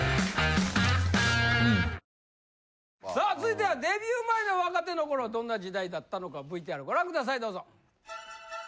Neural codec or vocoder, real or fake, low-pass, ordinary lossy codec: none; real; none; none